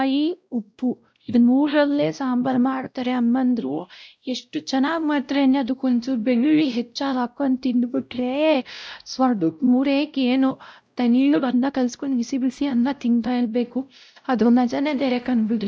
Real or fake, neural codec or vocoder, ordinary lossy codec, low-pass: fake; codec, 16 kHz, 0.5 kbps, X-Codec, WavLM features, trained on Multilingual LibriSpeech; none; none